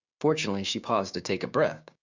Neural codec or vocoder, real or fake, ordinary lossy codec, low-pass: codec, 16 kHz, 6 kbps, DAC; fake; Opus, 64 kbps; 7.2 kHz